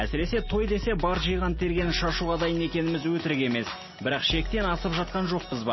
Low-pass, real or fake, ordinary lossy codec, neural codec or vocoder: 7.2 kHz; real; MP3, 24 kbps; none